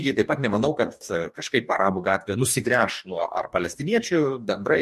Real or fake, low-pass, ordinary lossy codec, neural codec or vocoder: fake; 14.4 kHz; MP3, 64 kbps; codec, 44.1 kHz, 2.6 kbps, DAC